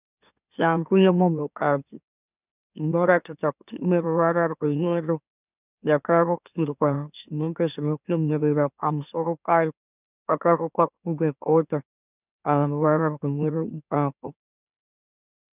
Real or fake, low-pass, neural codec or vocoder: fake; 3.6 kHz; autoencoder, 44.1 kHz, a latent of 192 numbers a frame, MeloTTS